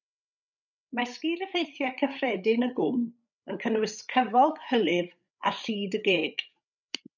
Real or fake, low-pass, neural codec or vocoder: fake; 7.2 kHz; codec, 16 kHz, 8 kbps, FreqCodec, larger model